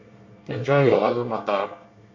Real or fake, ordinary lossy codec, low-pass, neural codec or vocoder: fake; MP3, 64 kbps; 7.2 kHz; codec, 24 kHz, 1 kbps, SNAC